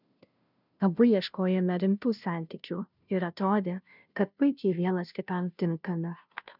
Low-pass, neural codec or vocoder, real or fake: 5.4 kHz; codec, 16 kHz, 0.5 kbps, FunCodec, trained on Chinese and English, 25 frames a second; fake